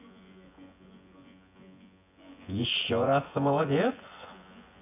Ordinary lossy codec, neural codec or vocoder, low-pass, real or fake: none; vocoder, 24 kHz, 100 mel bands, Vocos; 3.6 kHz; fake